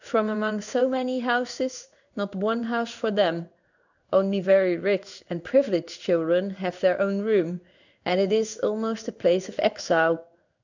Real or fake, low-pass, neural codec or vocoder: fake; 7.2 kHz; codec, 16 kHz in and 24 kHz out, 1 kbps, XY-Tokenizer